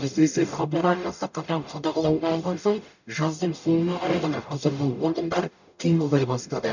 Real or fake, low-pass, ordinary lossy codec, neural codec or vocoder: fake; 7.2 kHz; none; codec, 44.1 kHz, 0.9 kbps, DAC